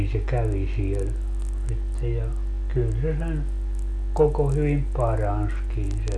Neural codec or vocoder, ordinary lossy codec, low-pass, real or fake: none; none; none; real